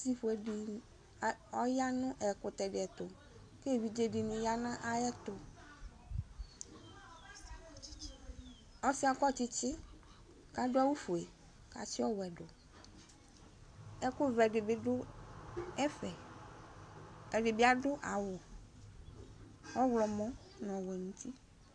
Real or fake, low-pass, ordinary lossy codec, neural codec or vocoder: real; 9.9 kHz; AAC, 64 kbps; none